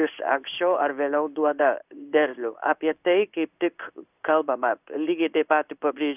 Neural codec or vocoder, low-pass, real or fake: codec, 16 kHz in and 24 kHz out, 1 kbps, XY-Tokenizer; 3.6 kHz; fake